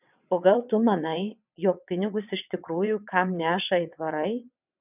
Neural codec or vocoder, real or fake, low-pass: vocoder, 22.05 kHz, 80 mel bands, WaveNeXt; fake; 3.6 kHz